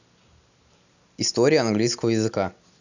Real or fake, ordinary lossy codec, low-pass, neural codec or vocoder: real; none; 7.2 kHz; none